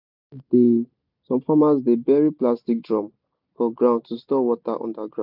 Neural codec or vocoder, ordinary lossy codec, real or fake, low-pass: none; none; real; 5.4 kHz